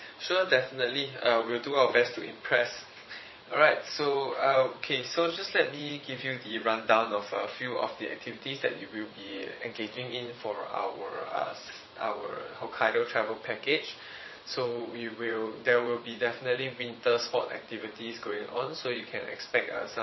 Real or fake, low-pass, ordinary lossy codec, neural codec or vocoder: fake; 7.2 kHz; MP3, 24 kbps; vocoder, 22.05 kHz, 80 mel bands, WaveNeXt